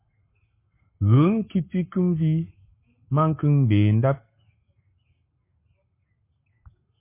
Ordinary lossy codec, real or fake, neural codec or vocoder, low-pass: MP3, 24 kbps; fake; codec, 44.1 kHz, 7.8 kbps, Pupu-Codec; 3.6 kHz